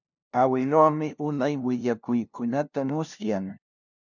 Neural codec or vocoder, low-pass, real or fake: codec, 16 kHz, 0.5 kbps, FunCodec, trained on LibriTTS, 25 frames a second; 7.2 kHz; fake